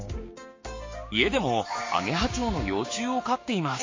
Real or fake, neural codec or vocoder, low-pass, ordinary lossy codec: fake; codec, 16 kHz, 6 kbps, DAC; 7.2 kHz; MP3, 32 kbps